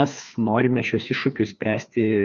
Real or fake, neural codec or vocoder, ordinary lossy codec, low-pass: fake; codec, 16 kHz, 2 kbps, FreqCodec, larger model; Opus, 64 kbps; 7.2 kHz